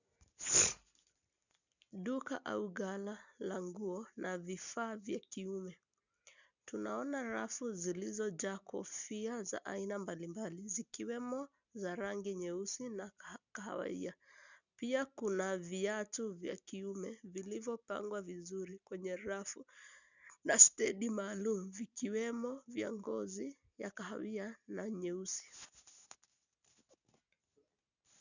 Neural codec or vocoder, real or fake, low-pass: none; real; 7.2 kHz